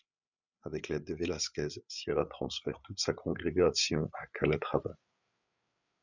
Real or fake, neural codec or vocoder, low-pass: fake; codec, 16 kHz, 8 kbps, FreqCodec, larger model; 7.2 kHz